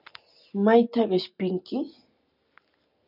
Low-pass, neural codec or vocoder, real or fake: 5.4 kHz; none; real